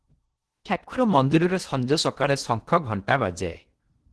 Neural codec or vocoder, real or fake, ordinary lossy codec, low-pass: codec, 16 kHz in and 24 kHz out, 0.8 kbps, FocalCodec, streaming, 65536 codes; fake; Opus, 16 kbps; 10.8 kHz